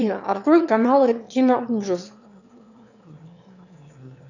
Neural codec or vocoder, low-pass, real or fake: autoencoder, 22.05 kHz, a latent of 192 numbers a frame, VITS, trained on one speaker; 7.2 kHz; fake